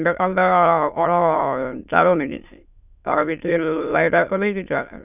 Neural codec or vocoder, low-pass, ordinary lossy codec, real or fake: autoencoder, 22.05 kHz, a latent of 192 numbers a frame, VITS, trained on many speakers; 3.6 kHz; none; fake